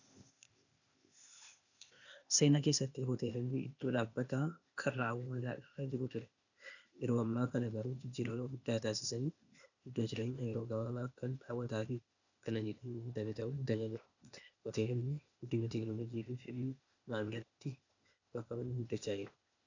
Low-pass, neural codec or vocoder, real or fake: 7.2 kHz; codec, 16 kHz, 0.8 kbps, ZipCodec; fake